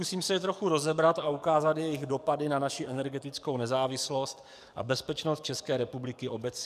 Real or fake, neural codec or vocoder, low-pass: fake; codec, 44.1 kHz, 7.8 kbps, DAC; 14.4 kHz